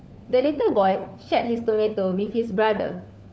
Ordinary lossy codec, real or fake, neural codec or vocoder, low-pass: none; fake; codec, 16 kHz, 4 kbps, FunCodec, trained on LibriTTS, 50 frames a second; none